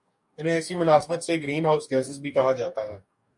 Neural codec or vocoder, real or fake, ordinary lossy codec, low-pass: codec, 44.1 kHz, 2.6 kbps, DAC; fake; MP3, 64 kbps; 10.8 kHz